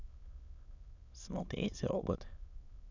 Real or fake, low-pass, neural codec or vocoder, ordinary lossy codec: fake; 7.2 kHz; autoencoder, 22.05 kHz, a latent of 192 numbers a frame, VITS, trained on many speakers; none